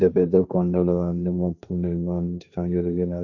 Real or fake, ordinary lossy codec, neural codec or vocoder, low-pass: fake; AAC, 48 kbps; codec, 16 kHz, 1.1 kbps, Voila-Tokenizer; 7.2 kHz